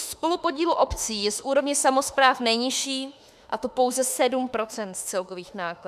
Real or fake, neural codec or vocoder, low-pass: fake; autoencoder, 48 kHz, 32 numbers a frame, DAC-VAE, trained on Japanese speech; 14.4 kHz